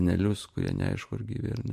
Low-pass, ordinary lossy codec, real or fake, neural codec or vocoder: 14.4 kHz; MP3, 64 kbps; fake; vocoder, 44.1 kHz, 128 mel bands every 512 samples, BigVGAN v2